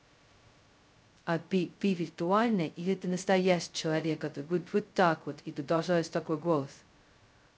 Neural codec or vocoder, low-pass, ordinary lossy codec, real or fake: codec, 16 kHz, 0.2 kbps, FocalCodec; none; none; fake